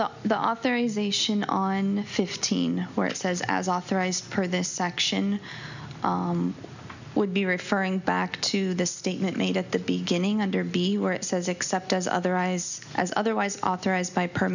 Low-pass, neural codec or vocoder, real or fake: 7.2 kHz; none; real